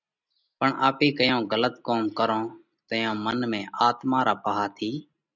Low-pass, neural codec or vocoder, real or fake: 7.2 kHz; none; real